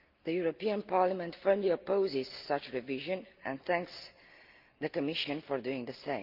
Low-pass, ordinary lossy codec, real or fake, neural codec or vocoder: 5.4 kHz; Opus, 24 kbps; real; none